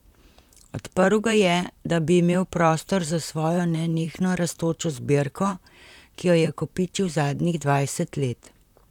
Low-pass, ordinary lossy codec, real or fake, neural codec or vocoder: 19.8 kHz; none; fake; vocoder, 44.1 kHz, 128 mel bands, Pupu-Vocoder